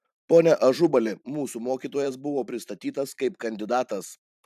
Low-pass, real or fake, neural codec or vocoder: 14.4 kHz; fake; vocoder, 44.1 kHz, 128 mel bands every 512 samples, BigVGAN v2